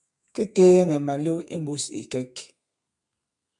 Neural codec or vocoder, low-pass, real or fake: codec, 32 kHz, 1.9 kbps, SNAC; 10.8 kHz; fake